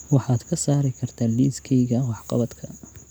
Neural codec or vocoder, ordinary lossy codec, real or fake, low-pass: none; none; real; none